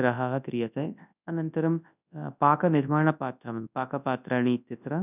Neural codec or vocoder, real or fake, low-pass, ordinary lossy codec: codec, 24 kHz, 0.9 kbps, WavTokenizer, large speech release; fake; 3.6 kHz; none